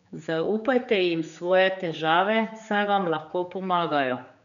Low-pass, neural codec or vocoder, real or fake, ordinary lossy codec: 7.2 kHz; codec, 16 kHz, 4 kbps, X-Codec, HuBERT features, trained on general audio; fake; none